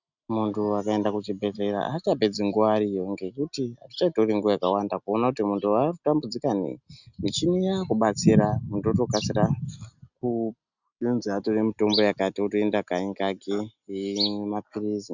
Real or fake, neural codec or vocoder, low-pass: real; none; 7.2 kHz